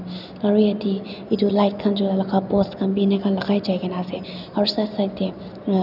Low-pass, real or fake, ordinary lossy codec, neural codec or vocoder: 5.4 kHz; real; none; none